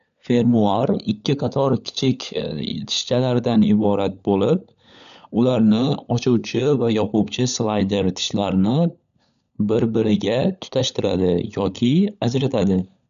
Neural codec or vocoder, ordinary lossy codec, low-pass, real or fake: codec, 16 kHz, 16 kbps, FunCodec, trained on LibriTTS, 50 frames a second; none; 7.2 kHz; fake